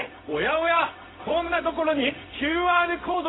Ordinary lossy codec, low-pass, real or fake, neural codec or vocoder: AAC, 16 kbps; 7.2 kHz; fake; codec, 16 kHz, 0.4 kbps, LongCat-Audio-Codec